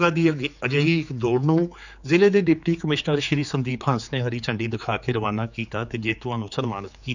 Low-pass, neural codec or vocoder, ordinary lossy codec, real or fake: 7.2 kHz; codec, 16 kHz, 4 kbps, X-Codec, HuBERT features, trained on general audio; none; fake